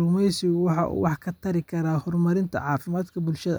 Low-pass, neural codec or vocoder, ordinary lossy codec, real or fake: none; none; none; real